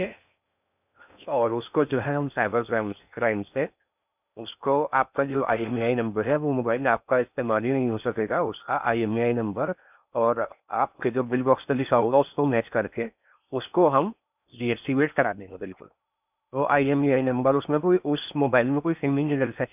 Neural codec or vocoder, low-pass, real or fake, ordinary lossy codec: codec, 16 kHz in and 24 kHz out, 0.6 kbps, FocalCodec, streaming, 2048 codes; 3.6 kHz; fake; none